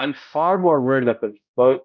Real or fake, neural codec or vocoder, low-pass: fake; codec, 16 kHz, 0.5 kbps, X-Codec, HuBERT features, trained on balanced general audio; 7.2 kHz